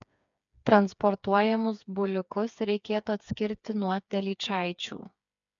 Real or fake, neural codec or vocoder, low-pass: fake; codec, 16 kHz, 4 kbps, FreqCodec, smaller model; 7.2 kHz